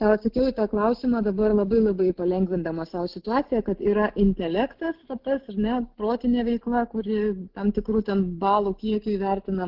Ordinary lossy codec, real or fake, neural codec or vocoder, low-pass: Opus, 16 kbps; real; none; 5.4 kHz